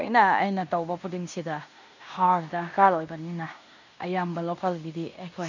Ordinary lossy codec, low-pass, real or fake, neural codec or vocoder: none; 7.2 kHz; fake; codec, 16 kHz in and 24 kHz out, 0.9 kbps, LongCat-Audio-Codec, fine tuned four codebook decoder